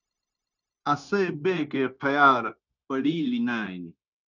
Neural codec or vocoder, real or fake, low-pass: codec, 16 kHz, 0.9 kbps, LongCat-Audio-Codec; fake; 7.2 kHz